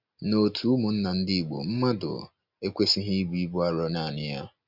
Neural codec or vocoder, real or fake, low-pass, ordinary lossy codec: none; real; 5.4 kHz; Opus, 64 kbps